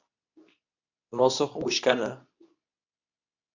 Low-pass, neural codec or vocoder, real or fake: 7.2 kHz; codec, 24 kHz, 0.9 kbps, WavTokenizer, medium speech release version 2; fake